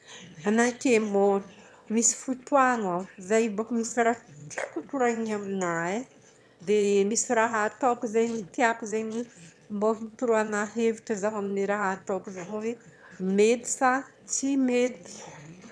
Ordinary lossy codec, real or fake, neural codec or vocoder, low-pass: none; fake; autoencoder, 22.05 kHz, a latent of 192 numbers a frame, VITS, trained on one speaker; none